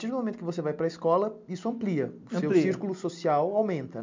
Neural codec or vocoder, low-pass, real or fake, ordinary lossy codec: none; 7.2 kHz; real; MP3, 64 kbps